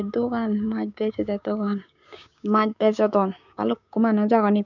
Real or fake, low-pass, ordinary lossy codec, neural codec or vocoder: fake; 7.2 kHz; none; codec, 16 kHz, 6 kbps, DAC